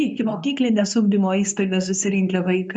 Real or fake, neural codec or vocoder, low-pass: fake; codec, 24 kHz, 0.9 kbps, WavTokenizer, medium speech release version 2; 9.9 kHz